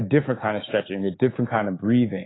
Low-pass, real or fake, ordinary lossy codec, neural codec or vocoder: 7.2 kHz; fake; AAC, 16 kbps; codec, 16 kHz, 6 kbps, DAC